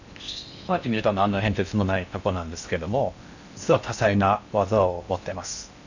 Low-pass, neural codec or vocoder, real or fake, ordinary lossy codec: 7.2 kHz; codec, 16 kHz in and 24 kHz out, 0.6 kbps, FocalCodec, streaming, 4096 codes; fake; none